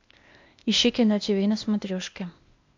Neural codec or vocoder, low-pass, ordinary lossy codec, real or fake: codec, 16 kHz, 0.8 kbps, ZipCodec; 7.2 kHz; MP3, 64 kbps; fake